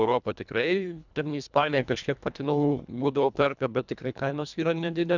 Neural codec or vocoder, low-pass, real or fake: codec, 24 kHz, 1.5 kbps, HILCodec; 7.2 kHz; fake